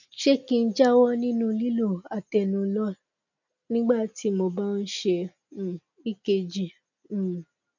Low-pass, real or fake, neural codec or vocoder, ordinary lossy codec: 7.2 kHz; real; none; none